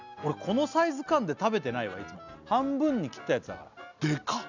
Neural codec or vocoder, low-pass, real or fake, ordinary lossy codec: none; 7.2 kHz; real; none